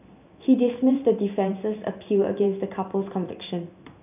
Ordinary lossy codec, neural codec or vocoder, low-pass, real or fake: none; vocoder, 44.1 kHz, 128 mel bands every 512 samples, BigVGAN v2; 3.6 kHz; fake